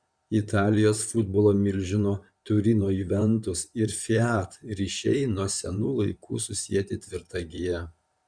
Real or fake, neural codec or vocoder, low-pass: fake; vocoder, 44.1 kHz, 128 mel bands, Pupu-Vocoder; 9.9 kHz